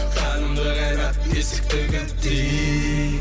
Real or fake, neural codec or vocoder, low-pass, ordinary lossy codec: real; none; none; none